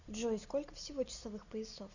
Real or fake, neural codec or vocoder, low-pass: real; none; 7.2 kHz